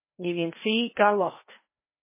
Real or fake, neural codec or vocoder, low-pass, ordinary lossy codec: fake; codec, 16 kHz, 1 kbps, FreqCodec, larger model; 3.6 kHz; MP3, 16 kbps